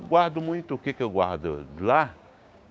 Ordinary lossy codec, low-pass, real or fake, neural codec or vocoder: none; none; fake; codec, 16 kHz, 6 kbps, DAC